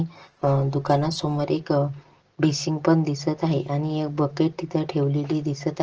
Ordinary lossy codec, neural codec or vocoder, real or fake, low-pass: Opus, 16 kbps; none; real; 7.2 kHz